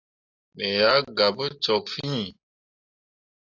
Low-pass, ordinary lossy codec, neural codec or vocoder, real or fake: 7.2 kHz; AAC, 48 kbps; none; real